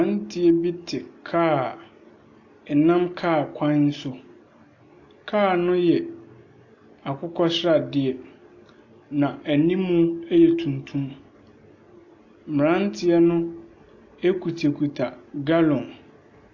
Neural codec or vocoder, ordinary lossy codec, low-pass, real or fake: none; AAC, 48 kbps; 7.2 kHz; real